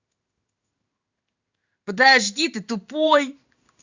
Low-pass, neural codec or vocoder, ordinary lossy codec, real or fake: 7.2 kHz; codec, 16 kHz in and 24 kHz out, 1 kbps, XY-Tokenizer; Opus, 64 kbps; fake